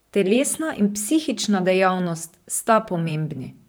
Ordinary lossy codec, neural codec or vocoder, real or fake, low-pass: none; vocoder, 44.1 kHz, 128 mel bands, Pupu-Vocoder; fake; none